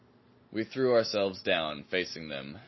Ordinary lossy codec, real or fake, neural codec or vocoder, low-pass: MP3, 24 kbps; real; none; 7.2 kHz